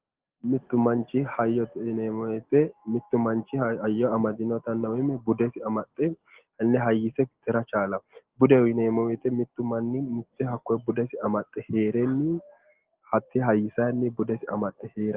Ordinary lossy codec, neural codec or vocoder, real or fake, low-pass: Opus, 16 kbps; none; real; 3.6 kHz